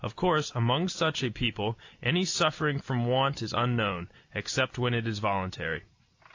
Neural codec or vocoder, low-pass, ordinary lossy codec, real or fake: none; 7.2 kHz; AAC, 48 kbps; real